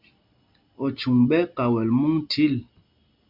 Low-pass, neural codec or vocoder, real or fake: 5.4 kHz; none; real